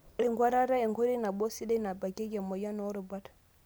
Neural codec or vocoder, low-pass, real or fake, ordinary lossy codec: none; none; real; none